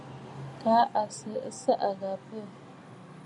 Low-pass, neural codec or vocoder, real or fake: 10.8 kHz; none; real